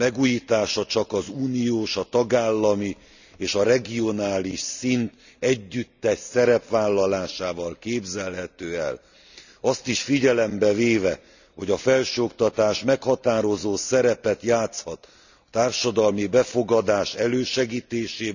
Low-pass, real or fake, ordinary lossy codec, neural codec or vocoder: 7.2 kHz; real; none; none